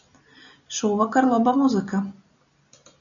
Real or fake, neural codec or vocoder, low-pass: real; none; 7.2 kHz